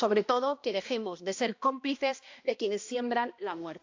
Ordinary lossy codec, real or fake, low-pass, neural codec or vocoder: AAC, 48 kbps; fake; 7.2 kHz; codec, 16 kHz, 1 kbps, X-Codec, HuBERT features, trained on balanced general audio